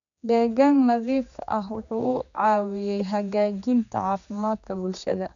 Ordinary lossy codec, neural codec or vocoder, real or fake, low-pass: none; codec, 16 kHz, 2 kbps, X-Codec, HuBERT features, trained on general audio; fake; 7.2 kHz